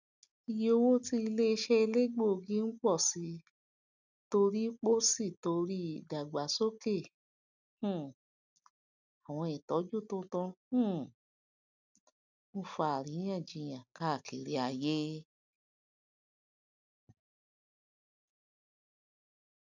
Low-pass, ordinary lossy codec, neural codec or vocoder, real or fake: 7.2 kHz; none; none; real